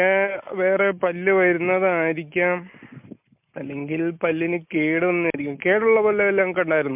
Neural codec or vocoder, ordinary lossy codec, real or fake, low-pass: none; MP3, 32 kbps; real; 3.6 kHz